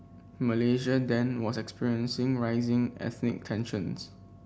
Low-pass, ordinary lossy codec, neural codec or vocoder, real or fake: none; none; none; real